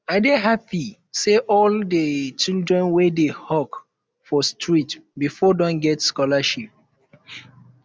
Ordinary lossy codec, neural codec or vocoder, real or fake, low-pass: none; none; real; none